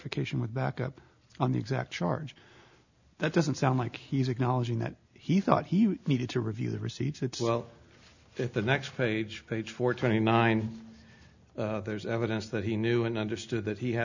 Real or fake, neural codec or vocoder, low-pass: real; none; 7.2 kHz